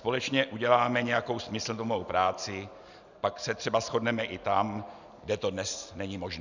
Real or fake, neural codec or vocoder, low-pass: real; none; 7.2 kHz